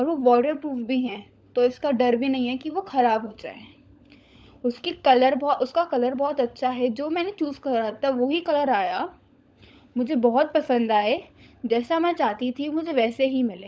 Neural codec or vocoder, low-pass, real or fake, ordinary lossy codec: codec, 16 kHz, 16 kbps, FunCodec, trained on LibriTTS, 50 frames a second; none; fake; none